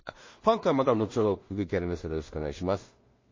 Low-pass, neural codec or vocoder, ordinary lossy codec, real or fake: 7.2 kHz; codec, 16 kHz in and 24 kHz out, 0.4 kbps, LongCat-Audio-Codec, two codebook decoder; MP3, 32 kbps; fake